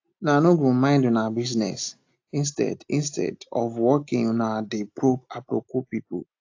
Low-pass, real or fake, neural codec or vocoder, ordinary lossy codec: 7.2 kHz; real; none; AAC, 48 kbps